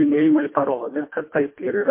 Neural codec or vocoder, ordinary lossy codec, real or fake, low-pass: codec, 24 kHz, 1.5 kbps, HILCodec; MP3, 24 kbps; fake; 3.6 kHz